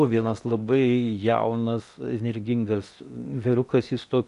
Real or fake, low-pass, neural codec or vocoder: fake; 10.8 kHz; codec, 16 kHz in and 24 kHz out, 0.8 kbps, FocalCodec, streaming, 65536 codes